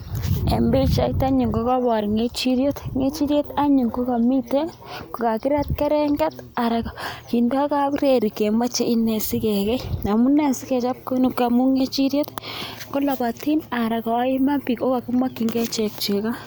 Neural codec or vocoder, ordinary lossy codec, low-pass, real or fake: none; none; none; real